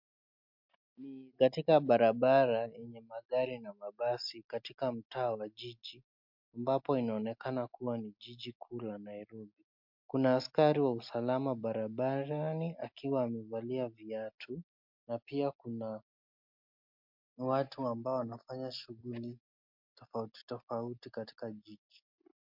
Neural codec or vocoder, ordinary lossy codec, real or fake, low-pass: none; MP3, 48 kbps; real; 5.4 kHz